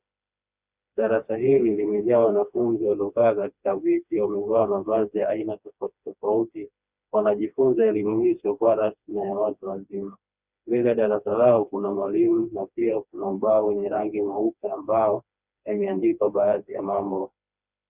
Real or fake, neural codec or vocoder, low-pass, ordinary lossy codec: fake; codec, 16 kHz, 2 kbps, FreqCodec, smaller model; 3.6 kHz; Opus, 64 kbps